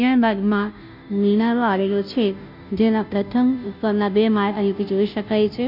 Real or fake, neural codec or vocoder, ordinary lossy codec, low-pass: fake; codec, 16 kHz, 0.5 kbps, FunCodec, trained on Chinese and English, 25 frames a second; none; 5.4 kHz